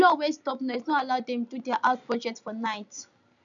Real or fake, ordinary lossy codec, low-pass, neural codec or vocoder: real; none; 7.2 kHz; none